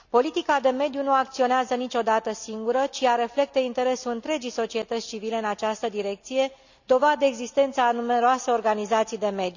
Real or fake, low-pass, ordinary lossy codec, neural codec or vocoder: real; 7.2 kHz; none; none